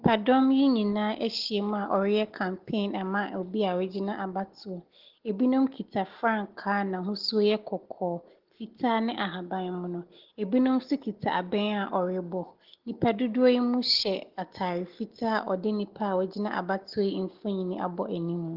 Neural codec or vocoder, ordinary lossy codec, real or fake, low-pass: none; Opus, 16 kbps; real; 5.4 kHz